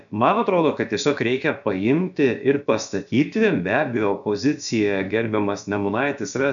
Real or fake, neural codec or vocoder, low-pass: fake; codec, 16 kHz, about 1 kbps, DyCAST, with the encoder's durations; 7.2 kHz